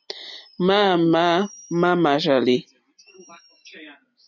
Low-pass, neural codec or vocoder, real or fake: 7.2 kHz; none; real